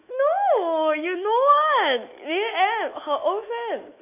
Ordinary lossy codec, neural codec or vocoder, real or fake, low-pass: MP3, 32 kbps; autoencoder, 48 kHz, 128 numbers a frame, DAC-VAE, trained on Japanese speech; fake; 3.6 kHz